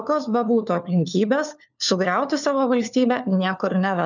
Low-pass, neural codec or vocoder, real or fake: 7.2 kHz; codec, 16 kHz, 4 kbps, FunCodec, trained on LibriTTS, 50 frames a second; fake